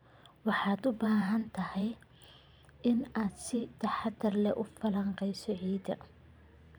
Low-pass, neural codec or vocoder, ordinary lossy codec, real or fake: none; vocoder, 44.1 kHz, 128 mel bands every 512 samples, BigVGAN v2; none; fake